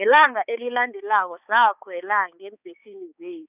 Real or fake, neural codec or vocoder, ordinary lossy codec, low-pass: fake; codec, 16 kHz, 4 kbps, X-Codec, HuBERT features, trained on balanced general audio; none; 3.6 kHz